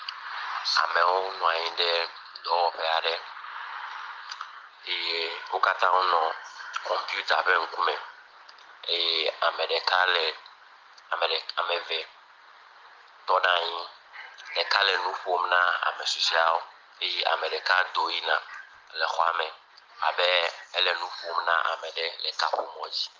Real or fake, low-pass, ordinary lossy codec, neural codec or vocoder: real; 7.2 kHz; Opus, 24 kbps; none